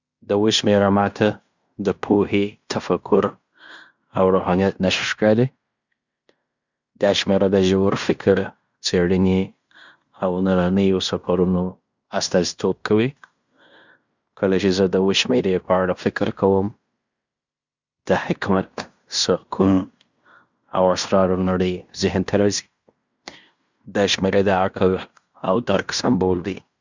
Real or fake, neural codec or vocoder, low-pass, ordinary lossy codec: fake; codec, 16 kHz in and 24 kHz out, 0.9 kbps, LongCat-Audio-Codec, fine tuned four codebook decoder; 7.2 kHz; Opus, 64 kbps